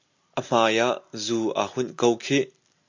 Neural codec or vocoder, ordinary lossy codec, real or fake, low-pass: none; MP3, 48 kbps; real; 7.2 kHz